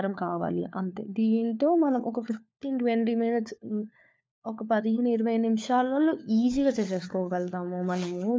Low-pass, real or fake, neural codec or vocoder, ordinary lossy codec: none; fake; codec, 16 kHz, 4 kbps, FunCodec, trained on LibriTTS, 50 frames a second; none